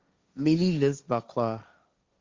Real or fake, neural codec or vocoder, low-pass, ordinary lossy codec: fake; codec, 16 kHz, 1.1 kbps, Voila-Tokenizer; 7.2 kHz; Opus, 32 kbps